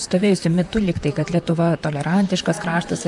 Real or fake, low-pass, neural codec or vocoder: fake; 10.8 kHz; vocoder, 44.1 kHz, 128 mel bands, Pupu-Vocoder